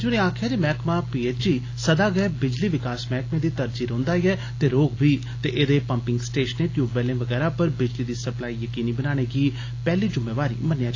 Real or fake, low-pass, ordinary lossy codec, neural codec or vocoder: real; 7.2 kHz; AAC, 32 kbps; none